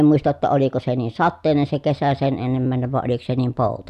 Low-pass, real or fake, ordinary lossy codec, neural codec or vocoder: 14.4 kHz; real; none; none